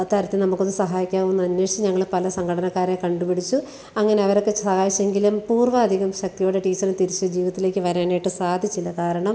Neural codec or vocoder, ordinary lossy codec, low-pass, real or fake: none; none; none; real